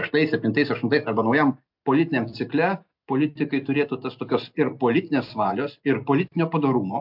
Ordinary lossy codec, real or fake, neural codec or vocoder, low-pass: MP3, 48 kbps; real; none; 5.4 kHz